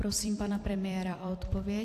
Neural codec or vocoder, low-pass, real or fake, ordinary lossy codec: vocoder, 44.1 kHz, 128 mel bands every 256 samples, BigVGAN v2; 14.4 kHz; fake; AAC, 64 kbps